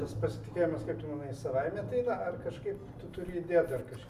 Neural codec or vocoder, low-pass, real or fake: none; 14.4 kHz; real